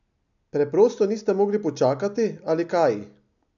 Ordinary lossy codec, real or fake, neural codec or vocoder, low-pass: none; real; none; 7.2 kHz